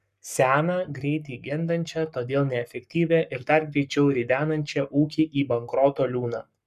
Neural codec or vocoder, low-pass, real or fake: codec, 44.1 kHz, 7.8 kbps, Pupu-Codec; 14.4 kHz; fake